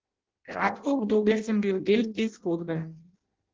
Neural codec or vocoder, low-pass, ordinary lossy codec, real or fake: codec, 16 kHz in and 24 kHz out, 0.6 kbps, FireRedTTS-2 codec; 7.2 kHz; Opus, 16 kbps; fake